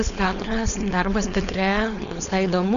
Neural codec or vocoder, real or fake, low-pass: codec, 16 kHz, 4.8 kbps, FACodec; fake; 7.2 kHz